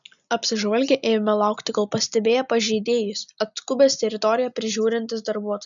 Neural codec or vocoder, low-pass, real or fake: none; 7.2 kHz; real